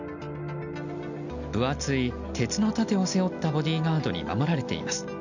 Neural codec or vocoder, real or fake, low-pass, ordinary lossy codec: none; real; 7.2 kHz; none